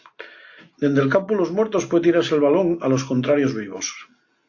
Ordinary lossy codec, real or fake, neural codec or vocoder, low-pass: AAC, 48 kbps; real; none; 7.2 kHz